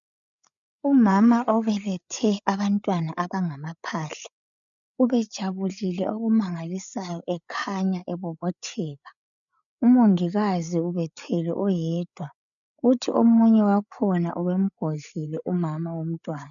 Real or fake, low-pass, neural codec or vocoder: fake; 7.2 kHz; codec, 16 kHz, 8 kbps, FreqCodec, larger model